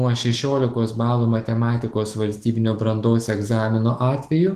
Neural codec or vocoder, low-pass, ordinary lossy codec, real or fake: autoencoder, 48 kHz, 128 numbers a frame, DAC-VAE, trained on Japanese speech; 14.4 kHz; Opus, 16 kbps; fake